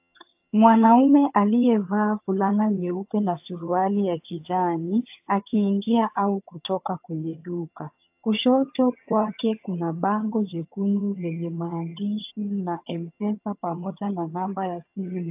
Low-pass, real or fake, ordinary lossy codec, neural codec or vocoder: 3.6 kHz; fake; AAC, 32 kbps; vocoder, 22.05 kHz, 80 mel bands, HiFi-GAN